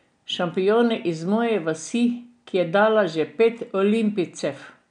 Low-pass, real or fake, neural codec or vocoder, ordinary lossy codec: 9.9 kHz; real; none; none